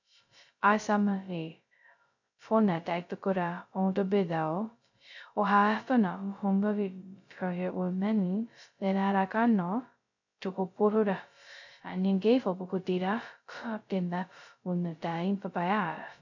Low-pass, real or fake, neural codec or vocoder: 7.2 kHz; fake; codec, 16 kHz, 0.2 kbps, FocalCodec